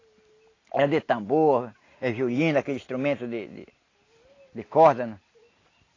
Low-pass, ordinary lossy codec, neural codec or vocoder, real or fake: 7.2 kHz; AAC, 32 kbps; none; real